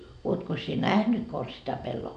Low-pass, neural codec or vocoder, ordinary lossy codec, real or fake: 9.9 kHz; none; none; real